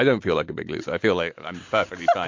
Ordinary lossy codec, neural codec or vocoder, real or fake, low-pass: MP3, 48 kbps; none; real; 7.2 kHz